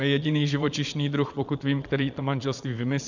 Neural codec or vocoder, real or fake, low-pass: vocoder, 22.05 kHz, 80 mel bands, Vocos; fake; 7.2 kHz